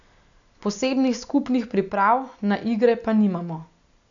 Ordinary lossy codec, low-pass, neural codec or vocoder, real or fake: none; 7.2 kHz; none; real